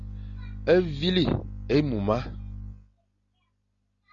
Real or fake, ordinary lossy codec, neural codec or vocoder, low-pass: real; Opus, 64 kbps; none; 7.2 kHz